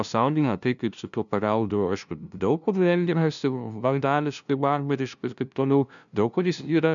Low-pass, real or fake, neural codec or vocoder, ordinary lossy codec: 7.2 kHz; fake; codec, 16 kHz, 0.5 kbps, FunCodec, trained on LibriTTS, 25 frames a second; MP3, 96 kbps